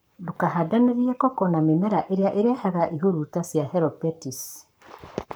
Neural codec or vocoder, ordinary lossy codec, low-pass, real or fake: codec, 44.1 kHz, 7.8 kbps, Pupu-Codec; none; none; fake